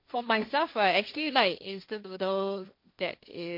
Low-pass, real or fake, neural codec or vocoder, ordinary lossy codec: 5.4 kHz; fake; codec, 16 kHz, 1.1 kbps, Voila-Tokenizer; MP3, 32 kbps